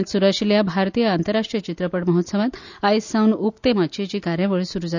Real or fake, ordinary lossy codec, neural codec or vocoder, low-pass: real; none; none; 7.2 kHz